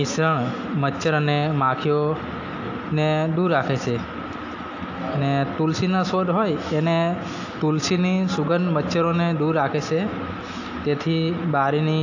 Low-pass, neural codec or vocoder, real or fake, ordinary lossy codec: 7.2 kHz; none; real; AAC, 48 kbps